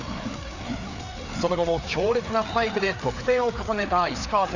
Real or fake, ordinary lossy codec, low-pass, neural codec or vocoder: fake; none; 7.2 kHz; codec, 16 kHz, 4 kbps, FreqCodec, larger model